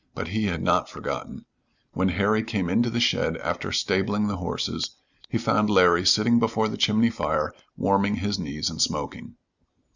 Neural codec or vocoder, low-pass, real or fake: none; 7.2 kHz; real